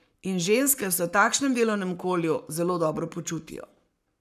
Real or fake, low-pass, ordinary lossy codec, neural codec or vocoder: fake; 14.4 kHz; none; codec, 44.1 kHz, 7.8 kbps, Pupu-Codec